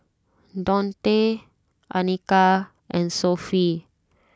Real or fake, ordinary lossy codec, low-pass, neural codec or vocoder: real; none; none; none